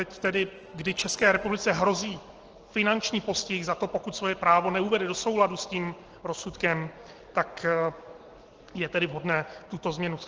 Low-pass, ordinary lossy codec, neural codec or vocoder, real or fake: 7.2 kHz; Opus, 16 kbps; none; real